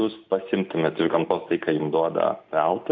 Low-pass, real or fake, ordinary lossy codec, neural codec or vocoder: 7.2 kHz; real; MP3, 64 kbps; none